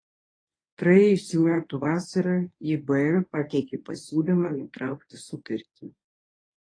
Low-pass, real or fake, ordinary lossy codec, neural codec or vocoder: 9.9 kHz; fake; AAC, 32 kbps; codec, 24 kHz, 0.9 kbps, WavTokenizer, medium speech release version 1